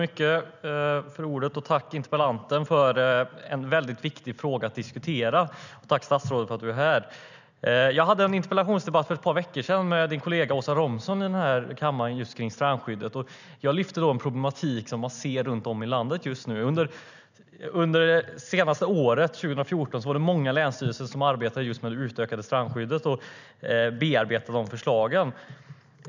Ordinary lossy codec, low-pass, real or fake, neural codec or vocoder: none; 7.2 kHz; real; none